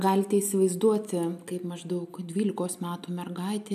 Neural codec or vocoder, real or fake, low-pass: none; real; 14.4 kHz